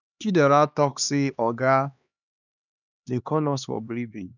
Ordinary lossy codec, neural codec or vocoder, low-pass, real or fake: none; codec, 16 kHz, 2 kbps, X-Codec, HuBERT features, trained on LibriSpeech; 7.2 kHz; fake